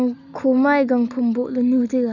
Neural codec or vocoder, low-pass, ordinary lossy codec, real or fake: none; 7.2 kHz; none; real